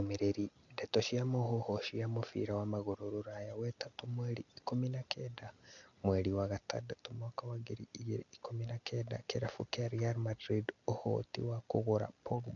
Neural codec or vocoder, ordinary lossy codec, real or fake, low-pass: none; none; real; 7.2 kHz